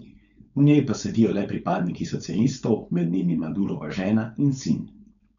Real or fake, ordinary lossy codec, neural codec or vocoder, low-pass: fake; none; codec, 16 kHz, 4.8 kbps, FACodec; 7.2 kHz